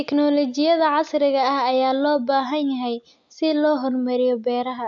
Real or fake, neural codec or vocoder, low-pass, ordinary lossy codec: real; none; 7.2 kHz; AAC, 64 kbps